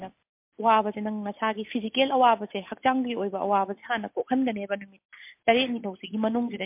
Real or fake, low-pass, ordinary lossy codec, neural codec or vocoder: real; 3.6 kHz; MP3, 32 kbps; none